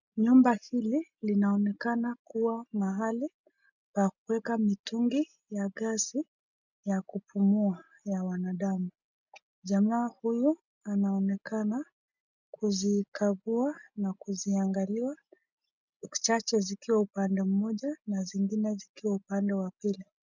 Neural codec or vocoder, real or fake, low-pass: none; real; 7.2 kHz